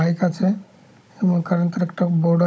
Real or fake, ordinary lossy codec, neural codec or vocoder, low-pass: fake; none; codec, 16 kHz, 16 kbps, FunCodec, trained on Chinese and English, 50 frames a second; none